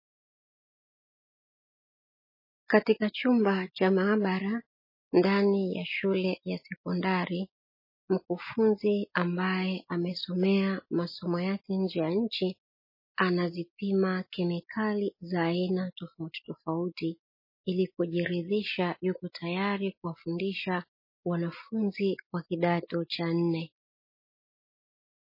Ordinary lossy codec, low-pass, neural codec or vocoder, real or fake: MP3, 24 kbps; 5.4 kHz; none; real